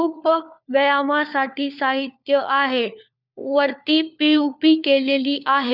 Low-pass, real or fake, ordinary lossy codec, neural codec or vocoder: 5.4 kHz; fake; none; codec, 16 kHz, 4 kbps, FunCodec, trained on LibriTTS, 50 frames a second